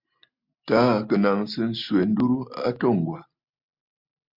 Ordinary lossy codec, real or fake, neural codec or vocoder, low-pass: MP3, 48 kbps; real; none; 5.4 kHz